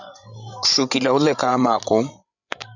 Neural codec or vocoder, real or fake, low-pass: codec, 16 kHz, 16 kbps, FreqCodec, larger model; fake; 7.2 kHz